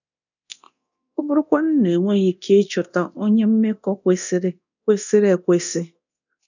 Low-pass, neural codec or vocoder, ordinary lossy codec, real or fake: 7.2 kHz; codec, 24 kHz, 0.9 kbps, DualCodec; none; fake